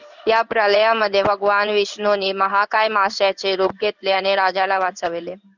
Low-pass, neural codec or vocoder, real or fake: 7.2 kHz; codec, 16 kHz in and 24 kHz out, 1 kbps, XY-Tokenizer; fake